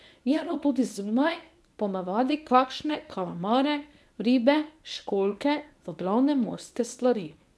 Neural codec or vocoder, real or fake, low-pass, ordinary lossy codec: codec, 24 kHz, 0.9 kbps, WavTokenizer, medium speech release version 1; fake; none; none